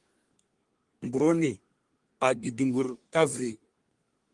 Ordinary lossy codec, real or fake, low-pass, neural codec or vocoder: Opus, 24 kbps; fake; 10.8 kHz; codec, 24 kHz, 1 kbps, SNAC